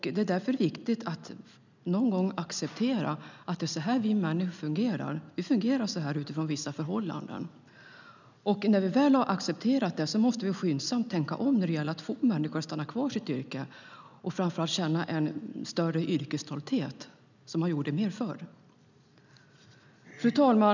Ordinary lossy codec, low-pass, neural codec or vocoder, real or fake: none; 7.2 kHz; none; real